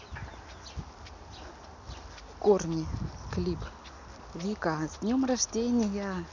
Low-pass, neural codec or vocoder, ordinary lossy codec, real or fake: 7.2 kHz; none; none; real